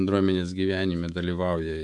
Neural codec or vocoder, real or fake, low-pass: codec, 24 kHz, 3.1 kbps, DualCodec; fake; 10.8 kHz